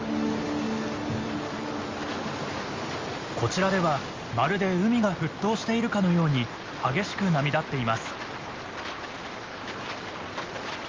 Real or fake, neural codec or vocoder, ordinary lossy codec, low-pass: real; none; Opus, 32 kbps; 7.2 kHz